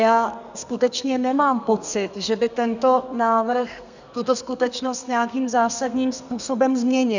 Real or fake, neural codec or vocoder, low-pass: fake; codec, 32 kHz, 1.9 kbps, SNAC; 7.2 kHz